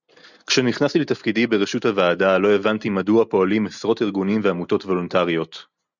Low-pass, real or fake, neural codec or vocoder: 7.2 kHz; real; none